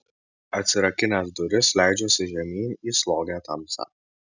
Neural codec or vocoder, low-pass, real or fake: none; 7.2 kHz; real